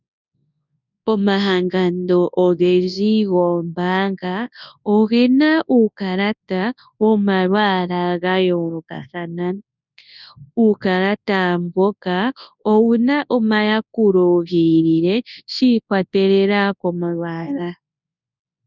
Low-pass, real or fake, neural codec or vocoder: 7.2 kHz; fake; codec, 24 kHz, 0.9 kbps, WavTokenizer, large speech release